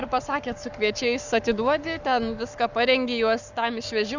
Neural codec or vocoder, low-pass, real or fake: codec, 44.1 kHz, 7.8 kbps, Pupu-Codec; 7.2 kHz; fake